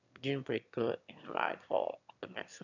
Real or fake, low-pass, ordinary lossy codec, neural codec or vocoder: fake; 7.2 kHz; none; autoencoder, 22.05 kHz, a latent of 192 numbers a frame, VITS, trained on one speaker